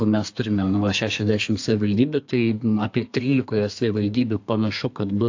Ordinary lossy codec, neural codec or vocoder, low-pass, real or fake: AAC, 48 kbps; codec, 32 kHz, 1.9 kbps, SNAC; 7.2 kHz; fake